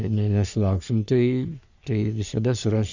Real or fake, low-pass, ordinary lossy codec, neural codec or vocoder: fake; 7.2 kHz; Opus, 64 kbps; codec, 44.1 kHz, 3.4 kbps, Pupu-Codec